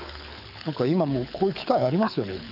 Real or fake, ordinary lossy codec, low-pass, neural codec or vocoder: fake; none; 5.4 kHz; codec, 24 kHz, 6 kbps, HILCodec